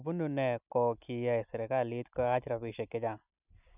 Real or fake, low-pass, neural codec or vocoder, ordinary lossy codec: real; 3.6 kHz; none; none